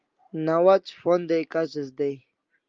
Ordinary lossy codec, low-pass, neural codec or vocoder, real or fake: Opus, 32 kbps; 7.2 kHz; none; real